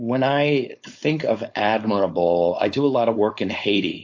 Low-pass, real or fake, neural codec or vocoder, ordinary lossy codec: 7.2 kHz; fake; codec, 16 kHz, 4.8 kbps, FACodec; AAC, 48 kbps